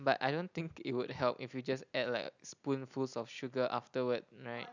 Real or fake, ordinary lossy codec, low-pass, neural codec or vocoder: real; none; 7.2 kHz; none